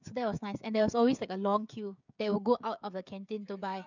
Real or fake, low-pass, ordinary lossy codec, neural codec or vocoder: fake; 7.2 kHz; none; codec, 16 kHz, 16 kbps, FreqCodec, smaller model